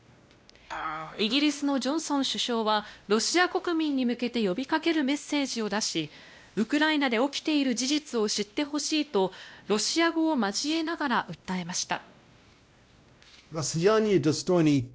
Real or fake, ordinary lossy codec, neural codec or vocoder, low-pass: fake; none; codec, 16 kHz, 1 kbps, X-Codec, WavLM features, trained on Multilingual LibriSpeech; none